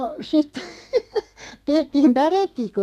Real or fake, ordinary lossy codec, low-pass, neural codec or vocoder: fake; none; 14.4 kHz; codec, 32 kHz, 1.9 kbps, SNAC